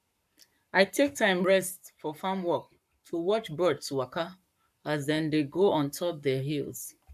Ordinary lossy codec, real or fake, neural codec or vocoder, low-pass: AAC, 96 kbps; fake; codec, 44.1 kHz, 7.8 kbps, Pupu-Codec; 14.4 kHz